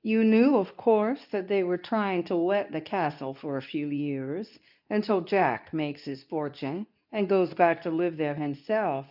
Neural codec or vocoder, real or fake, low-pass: codec, 24 kHz, 0.9 kbps, WavTokenizer, medium speech release version 2; fake; 5.4 kHz